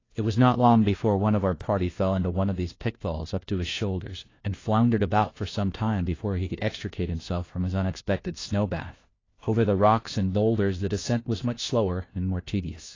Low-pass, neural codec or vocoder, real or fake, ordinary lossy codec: 7.2 kHz; codec, 16 kHz, 1 kbps, FunCodec, trained on LibriTTS, 50 frames a second; fake; AAC, 32 kbps